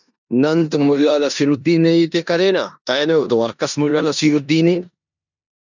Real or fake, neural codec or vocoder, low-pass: fake; codec, 16 kHz in and 24 kHz out, 0.9 kbps, LongCat-Audio-Codec, four codebook decoder; 7.2 kHz